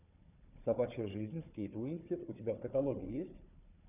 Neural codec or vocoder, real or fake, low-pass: codec, 16 kHz, 4 kbps, FunCodec, trained on Chinese and English, 50 frames a second; fake; 3.6 kHz